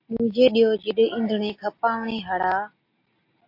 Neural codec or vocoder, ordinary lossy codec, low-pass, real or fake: none; AAC, 32 kbps; 5.4 kHz; real